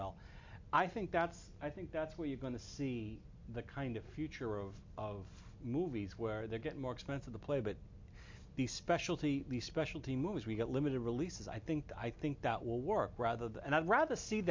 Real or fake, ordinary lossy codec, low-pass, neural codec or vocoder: real; MP3, 48 kbps; 7.2 kHz; none